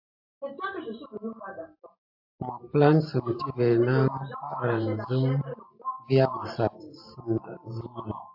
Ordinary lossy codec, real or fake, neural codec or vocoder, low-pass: AAC, 48 kbps; fake; vocoder, 44.1 kHz, 128 mel bands every 512 samples, BigVGAN v2; 5.4 kHz